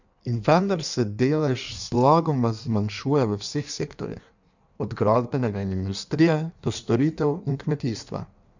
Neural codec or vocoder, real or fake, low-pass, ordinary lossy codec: codec, 16 kHz in and 24 kHz out, 1.1 kbps, FireRedTTS-2 codec; fake; 7.2 kHz; none